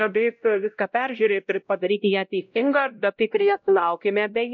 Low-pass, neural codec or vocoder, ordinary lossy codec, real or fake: 7.2 kHz; codec, 16 kHz, 0.5 kbps, X-Codec, WavLM features, trained on Multilingual LibriSpeech; MP3, 64 kbps; fake